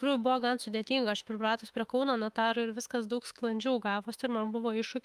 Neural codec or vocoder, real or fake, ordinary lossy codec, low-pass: autoencoder, 48 kHz, 32 numbers a frame, DAC-VAE, trained on Japanese speech; fake; Opus, 32 kbps; 14.4 kHz